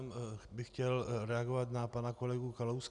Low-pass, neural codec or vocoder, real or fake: 10.8 kHz; none; real